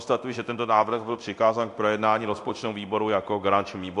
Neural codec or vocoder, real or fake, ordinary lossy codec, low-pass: codec, 24 kHz, 0.9 kbps, DualCodec; fake; AAC, 64 kbps; 10.8 kHz